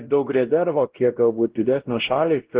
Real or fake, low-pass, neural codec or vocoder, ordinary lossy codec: fake; 3.6 kHz; codec, 16 kHz, 0.5 kbps, X-Codec, WavLM features, trained on Multilingual LibriSpeech; Opus, 16 kbps